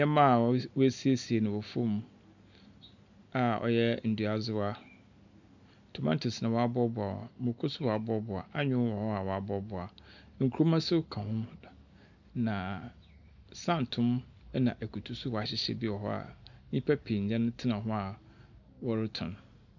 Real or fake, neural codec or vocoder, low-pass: real; none; 7.2 kHz